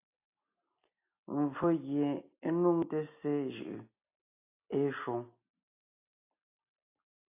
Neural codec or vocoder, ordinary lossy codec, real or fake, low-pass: none; AAC, 24 kbps; real; 3.6 kHz